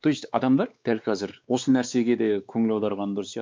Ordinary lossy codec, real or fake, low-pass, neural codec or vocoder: none; fake; none; codec, 16 kHz, 4 kbps, X-Codec, WavLM features, trained on Multilingual LibriSpeech